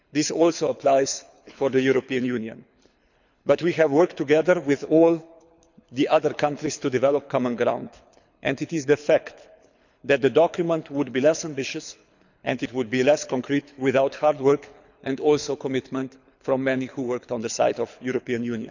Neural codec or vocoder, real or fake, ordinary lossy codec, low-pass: codec, 24 kHz, 6 kbps, HILCodec; fake; none; 7.2 kHz